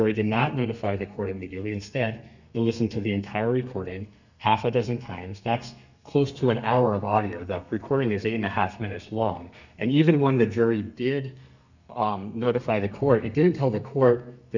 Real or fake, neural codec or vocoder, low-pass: fake; codec, 32 kHz, 1.9 kbps, SNAC; 7.2 kHz